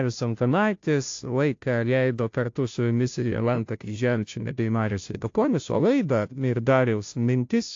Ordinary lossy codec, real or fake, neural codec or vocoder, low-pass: AAC, 48 kbps; fake; codec, 16 kHz, 0.5 kbps, FunCodec, trained on Chinese and English, 25 frames a second; 7.2 kHz